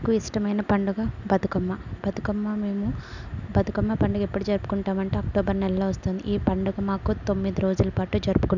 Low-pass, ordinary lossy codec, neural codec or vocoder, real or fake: 7.2 kHz; none; none; real